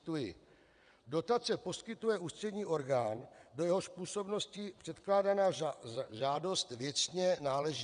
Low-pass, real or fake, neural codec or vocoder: 9.9 kHz; fake; vocoder, 22.05 kHz, 80 mel bands, WaveNeXt